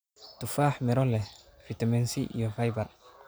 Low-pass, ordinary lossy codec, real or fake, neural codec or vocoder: none; none; real; none